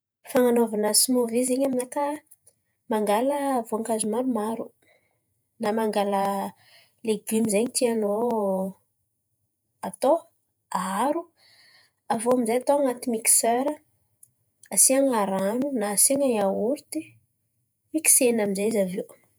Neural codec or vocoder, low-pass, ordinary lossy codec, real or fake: vocoder, 48 kHz, 128 mel bands, Vocos; none; none; fake